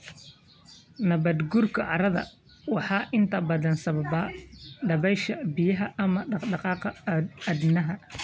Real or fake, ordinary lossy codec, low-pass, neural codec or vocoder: real; none; none; none